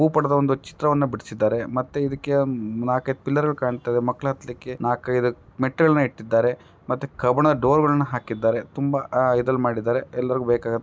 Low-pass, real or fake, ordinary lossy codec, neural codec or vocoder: none; real; none; none